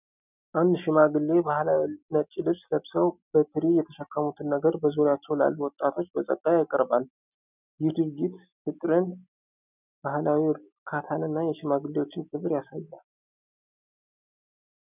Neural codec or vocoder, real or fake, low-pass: none; real; 3.6 kHz